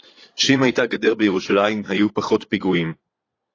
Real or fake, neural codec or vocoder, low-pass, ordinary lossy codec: fake; vocoder, 22.05 kHz, 80 mel bands, Vocos; 7.2 kHz; AAC, 32 kbps